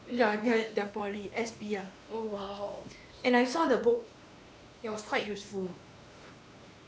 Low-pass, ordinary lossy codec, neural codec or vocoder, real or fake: none; none; codec, 16 kHz, 2 kbps, X-Codec, WavLM features, trained on Multilingual LibriSpeech; fake